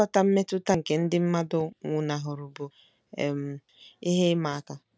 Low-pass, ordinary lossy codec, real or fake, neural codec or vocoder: none; none; real; none